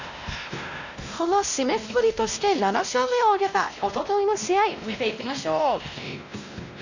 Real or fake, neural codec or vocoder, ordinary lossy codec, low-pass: fake; codec, 16 kHz, 1 kbps, X-Codec, WavLM features, trained on Multilingual LibriSpeech; none; 7.2 kHz